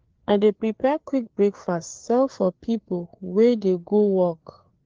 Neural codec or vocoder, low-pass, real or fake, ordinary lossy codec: codec, 16 kHz, 4 kbps, FreqCodec, larger model; 7.2 kHz; fake; Opus, 16 kbps